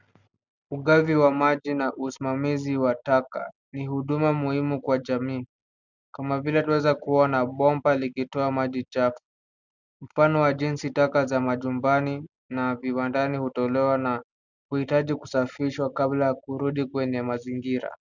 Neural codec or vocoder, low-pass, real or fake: none; 7.2 kHz; real